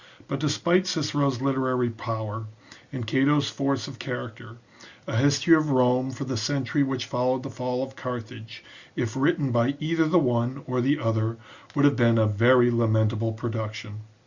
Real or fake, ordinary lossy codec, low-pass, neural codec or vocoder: real; Opus, 64 kbps; 7.2 kHz; none